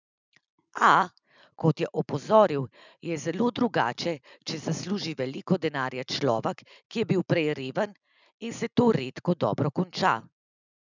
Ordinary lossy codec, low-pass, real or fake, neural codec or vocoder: none; 7.2 kHz; real; none